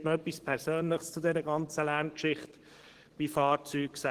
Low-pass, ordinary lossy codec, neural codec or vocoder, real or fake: 14.4 kHz; Opus, 16 kbps; codec, 44.1 kHz, 7.8 kbps, DAC; fake